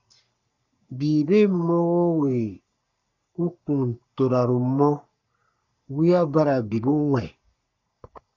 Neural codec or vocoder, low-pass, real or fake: codec, 44.1 kHz, 3.4 kbps, Pupu-Codec; 7.2 kHz; fake